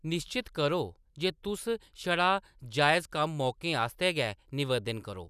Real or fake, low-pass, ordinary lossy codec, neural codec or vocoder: real; 14.4 kHz; none; none